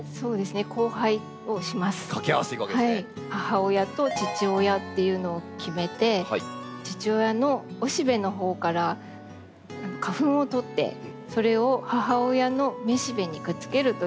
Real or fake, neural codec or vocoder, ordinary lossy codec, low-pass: real; none; none; none